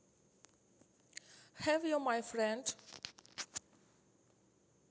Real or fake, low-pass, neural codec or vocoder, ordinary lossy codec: real; none; none; none